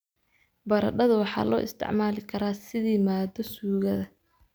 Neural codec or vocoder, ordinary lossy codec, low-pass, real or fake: none; none; none; real